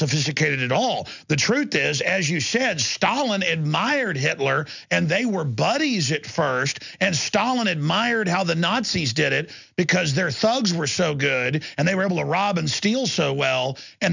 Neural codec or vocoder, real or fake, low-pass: none; real; 7.2 kHz